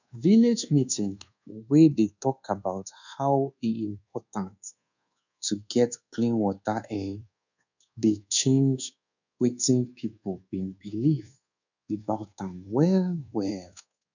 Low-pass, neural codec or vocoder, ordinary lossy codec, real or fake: 7.2 kHz; codec, 24 kHz, 1.2 kbps, DualCodec; none; fake